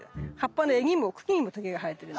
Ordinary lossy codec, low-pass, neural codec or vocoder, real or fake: none; none; none; real